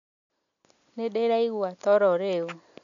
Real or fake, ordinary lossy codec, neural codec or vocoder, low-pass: real; none; none; 7.2 kHz